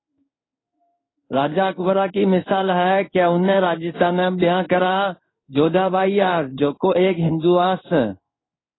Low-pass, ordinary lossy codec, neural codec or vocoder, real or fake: 7.2 kHz; AAC, 16 kbps; codec, 16 kHz in and 24 kHz out, 1 kbps, XY-Tokenizer; fake